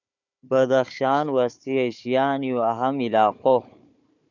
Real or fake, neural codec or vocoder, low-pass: fake; codec, 16 kHz, 4 kbps, FunCodec, trained on Chinese and English, 50 frames a second; 7.2 kHz